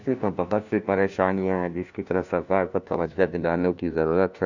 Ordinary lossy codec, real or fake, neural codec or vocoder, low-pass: none; fake; codec, 16 kHz, 1 kbps, FunCodec, trained on LibriTTS, 50 frames a second; 7.2 kHz